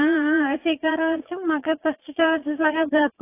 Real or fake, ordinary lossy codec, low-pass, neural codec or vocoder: fake; AAC, 24 kbps; 3.6 kHz; vocoder, 22.05 kHz, 80 mel bands, Vocos